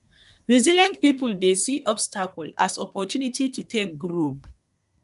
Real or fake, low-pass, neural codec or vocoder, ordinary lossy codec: fake; 10.8 kHz; codec, 24 kHz, 1 kbps, SNAC; none